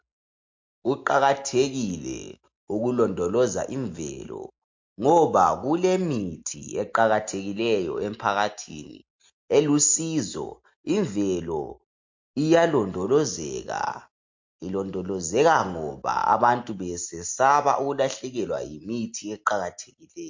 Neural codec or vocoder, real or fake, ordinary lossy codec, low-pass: none; real; MP3, 48 kbps; 7.2 kHz